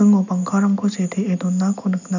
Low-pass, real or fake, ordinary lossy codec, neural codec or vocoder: 7.2 kHz; real; none; none